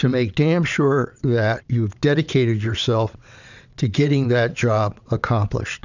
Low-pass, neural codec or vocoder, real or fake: 7.2 kHz; vocoder, 22.05 kHz, 80 mel bands, WaveNeXt; fake